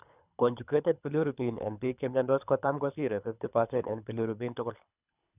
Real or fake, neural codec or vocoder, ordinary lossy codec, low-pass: fake; codec, 24 kHz, 3 kbps, HILCodec; none; 3.6 kHz